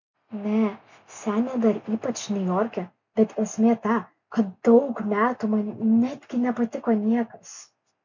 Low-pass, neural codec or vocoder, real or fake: 7.2 kHz; none; real